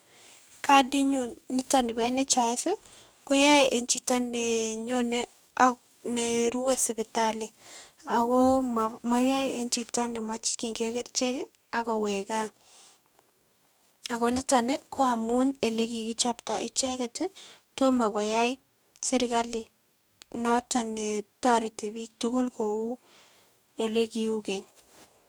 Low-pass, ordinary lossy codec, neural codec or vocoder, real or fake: none; none; codec, 44.1 kHz, 2.6 kbps, DAC; fake